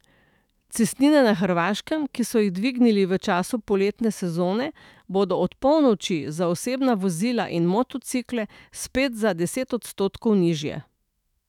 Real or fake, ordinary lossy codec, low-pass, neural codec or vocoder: fake; none; 19.8 kHz; autoencoder, 48 kHz, 128 numbers a frame, DAC-VAE, trained on Japanese speech